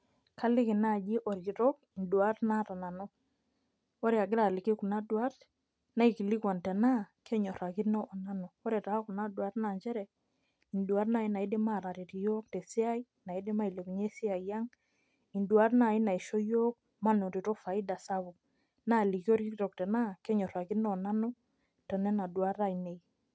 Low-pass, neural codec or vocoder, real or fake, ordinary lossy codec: none; none; real; none